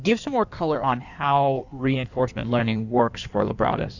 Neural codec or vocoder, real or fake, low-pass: codec, 16 kHz in and 24 kHz out, 1.1 kbps, FireRedTTS-2 codec; fake; 7.2 kHz